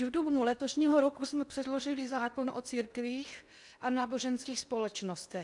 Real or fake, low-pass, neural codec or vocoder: fake; 10.8 kHz; codec, 16 kHz in and 24 kHz out, 0.8 kbps, FocalCodec, streaming, 65536 codes